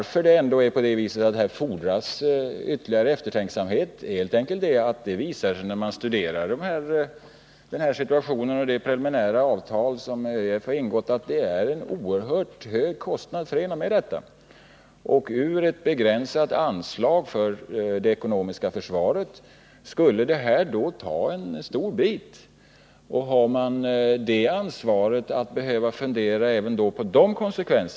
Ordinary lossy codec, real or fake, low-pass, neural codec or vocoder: none; real; none; none